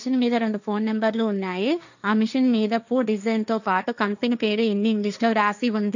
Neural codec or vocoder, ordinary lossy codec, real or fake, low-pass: codec, 16 kHz, 1.1 kbps, Voila-Tokenizer; none; fake; 7.2 kHz